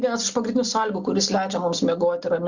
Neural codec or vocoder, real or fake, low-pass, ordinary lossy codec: none; real; 7.2 kHz; Opus, 64 kbps